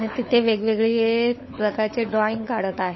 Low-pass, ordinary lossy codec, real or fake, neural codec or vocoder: 7.2 kHz; MP3, 24 kbps; fake; codec, 16 kHz, 16 kbps, FunCodec, trained on LibriTTS, 50 frames a second